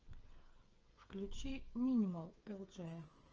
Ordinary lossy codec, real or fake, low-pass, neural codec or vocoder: Opus, 24 kbps; fake; 7.2 kHz; codec, 16 kHz, 8 kbps, FreqCodec, smaller model